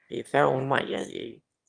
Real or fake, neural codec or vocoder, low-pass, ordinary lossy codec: fake; autoencoder, 22.05 kHz, a latent of 192 numbers a frame, VITS, trained on one speaker; 9.9 kHz; Opus, 32 kbps